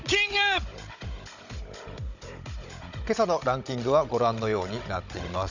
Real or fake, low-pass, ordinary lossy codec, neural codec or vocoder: fake; 7.2 kHz; none; codec, 16 kHz, 16 kbps, FunCodec, trained on Chinese and English, 50 frames a second